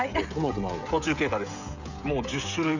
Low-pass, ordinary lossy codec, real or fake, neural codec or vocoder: 7.2 kHz; none; fake; codec, 16 kHz, 16 kbps, FreqCodec, smaller model